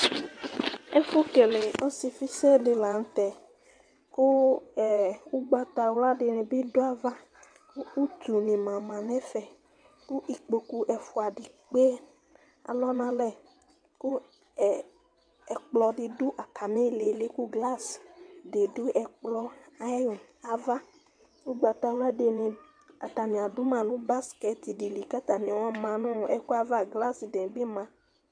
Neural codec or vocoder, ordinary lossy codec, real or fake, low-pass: vocoder, 22.05 kHz, 80 mel bands, WaveNeXt; AAC, 64 kbps; fake; 9.9 kHz